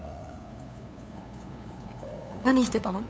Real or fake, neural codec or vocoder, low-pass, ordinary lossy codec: fake; codec, 16 kHz, 8 kbps, FunCodec, trained on LibriTTS, 25 frames a second; none; none